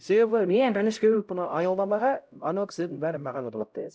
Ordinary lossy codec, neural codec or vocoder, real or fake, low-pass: none; codec, 16 kHz, 0.5 kbps, X-Codec, HuBERT features, trained on LibriSpeech; fake; none